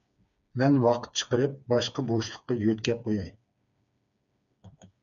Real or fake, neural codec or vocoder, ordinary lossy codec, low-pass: fake; codec, 16 kHz, 4 kbps, FreqCodec, smaller model; MP3, 96 kbps; 7.2 kHz